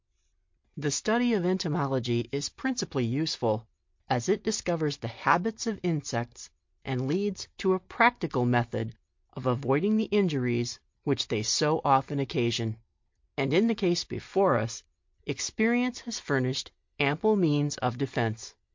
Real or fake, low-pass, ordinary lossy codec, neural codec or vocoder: real; 7.2 kHz; MP3, 48 kbps; none